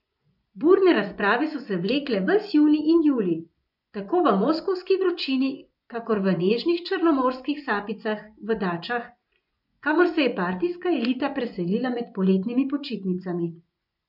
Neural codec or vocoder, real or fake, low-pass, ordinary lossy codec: vocoder, 24 kHz, 100 mel bands, Vocos; fake; 5.4 kHz; none